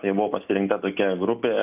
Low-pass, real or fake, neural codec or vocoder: 3.6 kHz; real; none